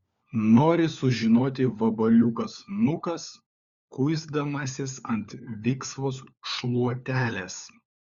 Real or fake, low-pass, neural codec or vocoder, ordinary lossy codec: fake; 7.2 kHz; codec, 16 kHz, 4 kbps, FunCodec, trained on LibriTTS, 50 frames a second; Opus, 64 kbps